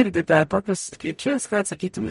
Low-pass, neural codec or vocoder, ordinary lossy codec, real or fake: 19.8 kHz; codec, 44.1 kHz, 0.9 kbps, DAC; MP3, 48 kbps; fake